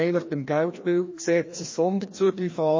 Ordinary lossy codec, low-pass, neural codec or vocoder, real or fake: MP3, 32 kbps; 7.2 kHz; codec, 16 kHz, 1 kbps, FreqCodec, larger model; fake